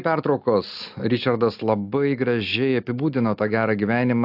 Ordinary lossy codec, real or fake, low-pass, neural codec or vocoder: AAC, 48 kbps; real; 5.4 kHz; none